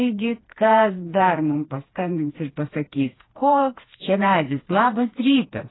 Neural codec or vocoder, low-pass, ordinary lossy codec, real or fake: codec, 16 kHz, 2 kbps, FreqCodec, smaller model; 7.2 kHz; AAC, 16 kbps; fake